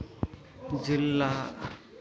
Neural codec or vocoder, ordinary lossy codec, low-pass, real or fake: none; none; none; real